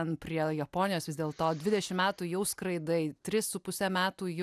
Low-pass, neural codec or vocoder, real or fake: 14.4 kHz; none; real